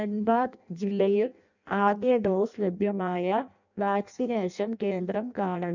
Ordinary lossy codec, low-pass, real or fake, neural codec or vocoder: none; 7.2 kHz; fake; codec, 16 kHz in and 24 kHz out, 0.6 kbps, FireRedTTS-2 codec